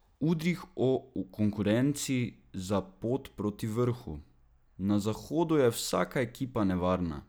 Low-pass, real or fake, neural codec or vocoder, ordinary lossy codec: none; real; none; none